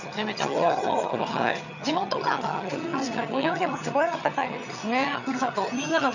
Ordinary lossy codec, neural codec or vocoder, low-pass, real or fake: none; vocoder, 22.05 kHz, 80 mel bands, HiFi-GAN; 7.2 kHz; fake